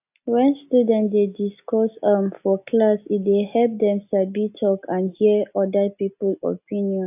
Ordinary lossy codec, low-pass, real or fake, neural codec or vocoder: AAC, 32 kbps; 3.6 kHz; real; none